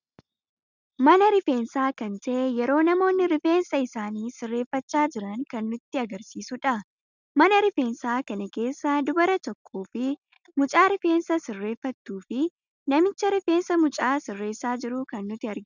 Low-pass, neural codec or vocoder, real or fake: 7.2 kHz; none; real